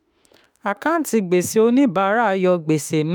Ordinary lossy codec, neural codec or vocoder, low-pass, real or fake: none; autoencoder, 48 kHz, 32 numbers a frame, DAC-VAE, trained on Japanese speech; none; fake